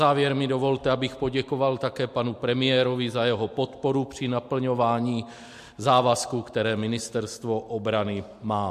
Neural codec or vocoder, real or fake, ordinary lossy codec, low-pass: none; real; MP3, 64 kbps; 14.4 kHz